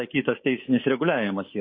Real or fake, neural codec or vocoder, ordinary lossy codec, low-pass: fake; codec, 24 kHz, 3.1 kbps, DualCodec; MP3, 24 kbps; 7.2 kHz